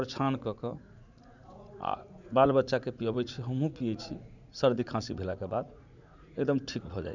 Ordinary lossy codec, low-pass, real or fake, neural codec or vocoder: none; 7.2 kHz; fake; vocoder, 44.1 kHz, 80 mel bands, Vocos